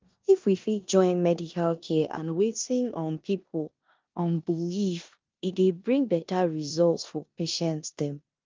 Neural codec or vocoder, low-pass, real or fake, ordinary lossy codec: codec, 16 kHz in and 24 kHz out, 0.9 kbps, LongCat-Audio-Codec, four codebook decoder; 7.2 kHz; fake; Opus, 24 kbps